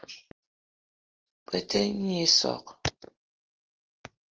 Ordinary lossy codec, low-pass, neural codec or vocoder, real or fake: Opus, 16 kbps; 7.2 kHz; none; real